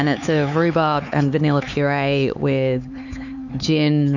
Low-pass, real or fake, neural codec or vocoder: 7.2 kHz; fake; codec, 16 kHz, 4 kbps, X-Codec, HuBERT features, trained on LibriSpeech